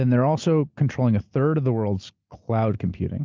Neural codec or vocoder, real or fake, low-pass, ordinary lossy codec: none; real; 7.2 kHz; Opus, 24 kbps